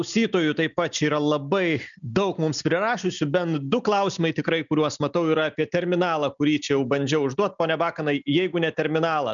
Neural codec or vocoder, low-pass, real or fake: none; 7.2 kHz; real